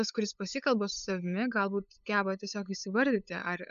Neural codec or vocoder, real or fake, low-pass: codec, 16 kHz, 8 kbps, FunCodec, trained on LibriTTS, 25 frames a second; fake; 7.2 kHz